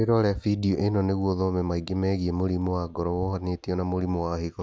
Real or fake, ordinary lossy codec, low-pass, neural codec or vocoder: real; none; none; none